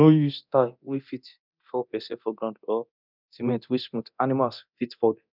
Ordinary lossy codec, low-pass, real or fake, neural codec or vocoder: none; 5.4 kHz; fake; codec, 24 kHz, 0.9 kbps, DualCodec